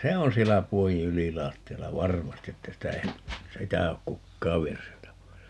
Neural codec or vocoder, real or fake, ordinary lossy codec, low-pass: none; real; none; none